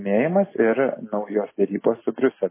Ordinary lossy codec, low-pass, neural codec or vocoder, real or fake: MP3, 16 kbps; 3.6 kHz; none; real